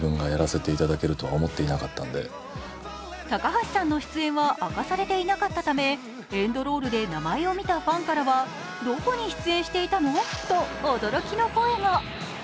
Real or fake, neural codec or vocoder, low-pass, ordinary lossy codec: real; none; none; none